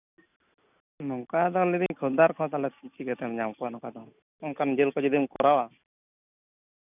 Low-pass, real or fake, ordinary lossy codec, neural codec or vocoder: 3.6 kHz; real; none; none